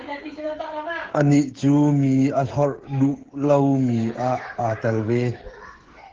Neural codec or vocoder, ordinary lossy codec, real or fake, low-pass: codec, 16 kHz, 8 kbps, FreqCodec, smaller model; Opus, 16 kbps; fake; 7.2 kHz